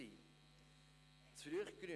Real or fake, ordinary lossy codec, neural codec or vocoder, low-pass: real; none; none; none